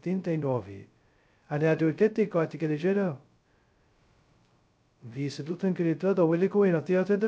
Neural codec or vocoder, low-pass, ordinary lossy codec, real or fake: codec, 16 kHz, 0.2 kbps, FocalCodec; none; none; fake